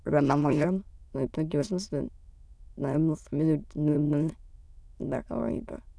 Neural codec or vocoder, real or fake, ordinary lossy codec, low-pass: autoencoder, 22.05 kHz, a latent of 192 numbers a frame, VITS, trained on many speakers; fake; none; none